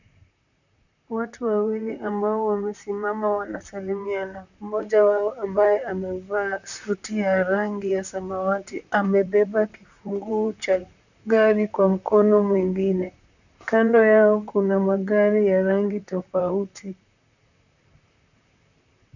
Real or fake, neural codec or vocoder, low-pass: fake; vocoder, 44.1 kHz, 128 mel bands, Pupu-Vocoder; 7.2 kHz